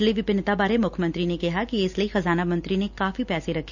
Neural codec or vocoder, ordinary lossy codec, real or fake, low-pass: none; none; real; 7.2 kHz